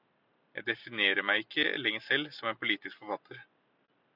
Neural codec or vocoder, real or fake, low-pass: none; real; 5.4 kHz